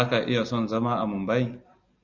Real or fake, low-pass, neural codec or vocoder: real; 7.2 kHz; none